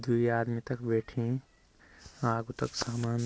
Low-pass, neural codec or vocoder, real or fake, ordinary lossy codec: none; none; real; none